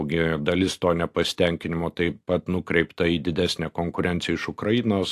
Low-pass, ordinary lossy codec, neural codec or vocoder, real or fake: 14.4 kHz; AAC, 64 kbps; none; real